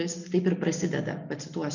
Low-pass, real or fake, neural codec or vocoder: 7.2 kHz; real; none